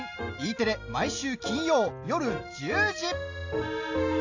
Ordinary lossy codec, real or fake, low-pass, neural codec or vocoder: AAC, 48 kbps; real; 7.2 kHz; none